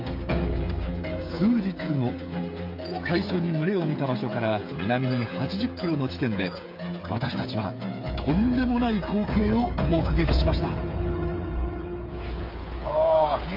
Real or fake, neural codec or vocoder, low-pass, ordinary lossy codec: fake; codec, 16 kHz, 8 kbps, FreqCodec, smaller model; 5.4 kHz; MP3, 32 kbps